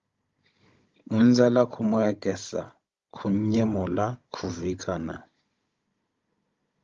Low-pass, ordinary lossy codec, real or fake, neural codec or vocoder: 7.2 kHz; Opus, 32 kbps; fake; codec, 16 kHz, 16 kbps, FunCodec, trained on Chinese and English, 50 frames a second